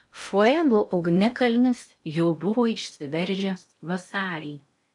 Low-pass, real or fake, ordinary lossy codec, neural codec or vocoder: 10.8 kHz; fake; MP3, 64 kbps; codec, 16 kHz in and 24 kHz out, 0.6 kbps, FocalCodec, streaming, 2048 codes